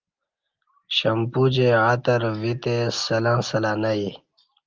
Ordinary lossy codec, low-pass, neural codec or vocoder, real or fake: Opus, 32 kbps; 7.2 kHz; none; real